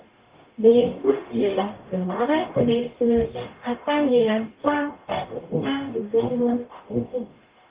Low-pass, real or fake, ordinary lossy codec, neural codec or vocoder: 3.6 kHz; fake; Opus, 32 kbps; codec, 44.1 kHz, 0.9 kbps, DAC